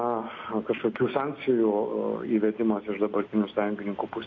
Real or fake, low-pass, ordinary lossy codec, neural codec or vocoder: real; 7.2 kHz; AAC, 32 kbps; none